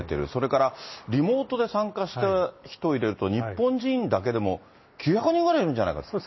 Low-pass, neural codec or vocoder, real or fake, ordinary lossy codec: 7.2 kHz; none; real; MP3, 24 kbps